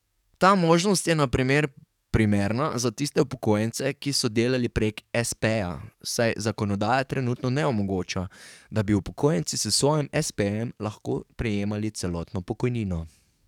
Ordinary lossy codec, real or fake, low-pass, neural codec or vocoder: none; fake; 19.8 kHz; codec, 44.1 kHz, 7.8 kbps, DAC